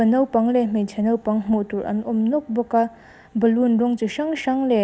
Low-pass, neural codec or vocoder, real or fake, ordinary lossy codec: none; none; real; none